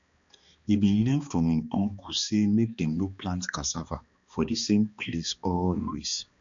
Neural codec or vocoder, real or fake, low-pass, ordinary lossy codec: codec, 16 kHz, 2 kbps, X-Codec, HuBERT features, trained on balanced general audio; fake; 7.2 kHz; MP3, 64 kbps